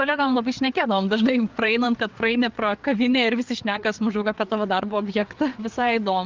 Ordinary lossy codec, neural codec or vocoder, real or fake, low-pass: Opus, 32 kbps; codec, 16 kHz, 4 kbps, FreqCodec, larger model; fake; 7.2 kHz